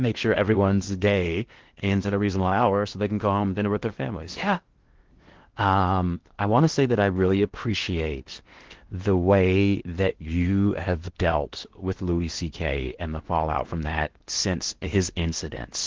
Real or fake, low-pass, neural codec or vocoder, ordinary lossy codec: fake; 7.2 kHz; codec, 16 kHz in and 24 kHz out, 0.8 kbps, FocalCodec, streaming, 65536 codes; Opus, 16 kbps